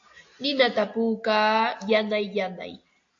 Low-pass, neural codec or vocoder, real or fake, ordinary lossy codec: 7.2 kHz; none; real; AAC, 48 kbps